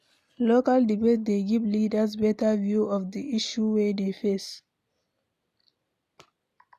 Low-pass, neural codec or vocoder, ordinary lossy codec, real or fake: 14.4 kHz; none; none; real